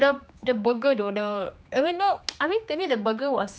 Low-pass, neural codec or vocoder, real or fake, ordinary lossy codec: none; codec, 16 kHz, 2 kbps, X-Codec, HuBERT features, trained on general audio; fake; none